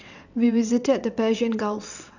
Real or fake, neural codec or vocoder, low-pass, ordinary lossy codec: fake; vocoder, 22.05 kHz, 80 mel bands, WaveNeXt; 7.2 kHz; none